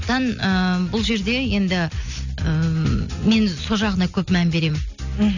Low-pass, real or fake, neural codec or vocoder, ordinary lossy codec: 7.2 kHz; real; none; none